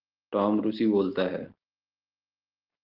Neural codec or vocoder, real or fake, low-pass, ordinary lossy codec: none; real; 5.4 kHz; Opus, 16 kbps